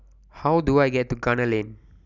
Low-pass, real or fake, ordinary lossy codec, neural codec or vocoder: 7.2 kHz; real; none; none